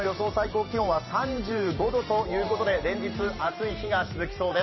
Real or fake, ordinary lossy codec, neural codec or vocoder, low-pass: fake; MP3, 24 kbps; vocoder, 44.1 kHz, 128 mel bands every 512 samples, BigVGAN v2; 7.2 kHz